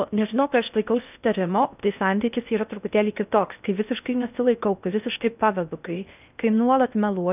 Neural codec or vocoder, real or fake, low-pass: codec, 16 kHz in and 24 kHz out, 0.6 kbps, FocalCodec, streaming, 4096 codes; fake; 3.6 kHz